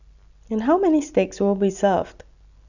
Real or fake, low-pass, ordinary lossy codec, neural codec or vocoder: real; 7.2 kHz; none; none